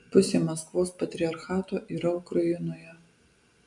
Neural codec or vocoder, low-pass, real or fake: none; 10.8 kHz; real